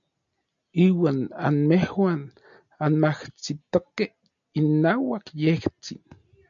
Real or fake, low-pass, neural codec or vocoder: real; 7.2 kHz; none